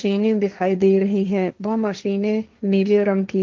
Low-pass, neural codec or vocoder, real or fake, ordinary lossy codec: 7.2 kHz; codec, 16 kHz, 1.1 kbps, Voila-Tokenizer; fake; Opus, 24 kbps